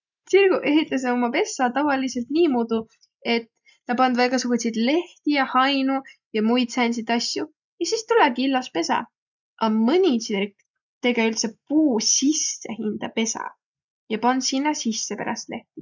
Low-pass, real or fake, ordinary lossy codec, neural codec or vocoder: 7.2 kHz; real; none; none